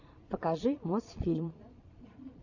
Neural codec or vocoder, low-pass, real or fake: vocoder, 22.05 kHz, 80 mel bands, Vocos; 7.2 kHz; fake